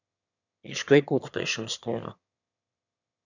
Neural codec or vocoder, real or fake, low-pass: autoencoder, 22.05 kHz, a latent of 192 numbers a frame, VITS, trained on one speaker; fake; 7.2 kHz